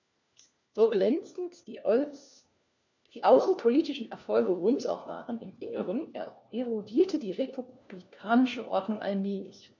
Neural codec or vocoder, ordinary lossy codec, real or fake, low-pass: codec, 16 kHz, 1 kbps, FunCodec, trained on LibriTTS, 50 frames a second; none; fake; 7.2 kHz